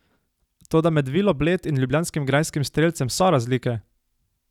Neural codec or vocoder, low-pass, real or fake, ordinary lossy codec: none; 19.8 kHz; real; none